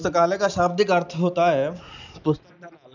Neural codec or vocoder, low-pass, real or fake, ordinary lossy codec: none; 7.2 kHz; real; none